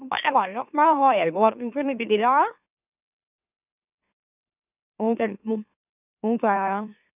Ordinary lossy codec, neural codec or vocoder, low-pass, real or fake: none; autoencoder, 44.1 kHz, a latent of 192 numbers a frame, MeloTTS; 3.6 kHz; fake